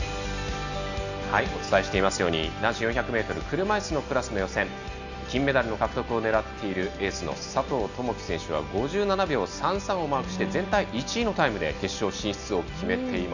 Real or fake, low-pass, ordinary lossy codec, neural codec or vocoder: real; 7.2 kHz; none; none